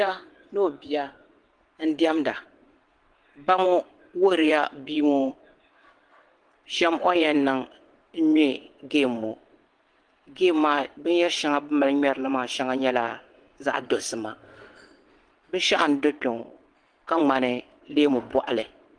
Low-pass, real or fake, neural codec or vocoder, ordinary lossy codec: 9.9 kHz; fake; vocoder, 22.05 kHz, 80 mel bands, WaveNeXt; Opus, 24 kbps